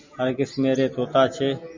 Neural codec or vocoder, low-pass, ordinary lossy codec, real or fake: none; 7.2 kHz; MP3, 64 kbps; real